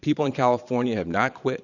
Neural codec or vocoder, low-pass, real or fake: vocoder, 22.05 kHz, 80 mel bands, Vocos; 7.2 kHz; fake